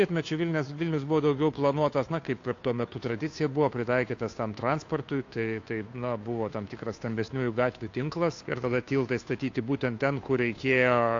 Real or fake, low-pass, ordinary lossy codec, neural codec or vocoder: fake; 7.2 kHz; AAC, 48 kbps; codec, 16 kHz, 2 kbps, FunCodec, trained on Chinese and English, 25 frames a second